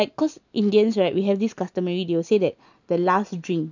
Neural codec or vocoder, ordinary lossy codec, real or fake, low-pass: none; none; real; 7.2 kHz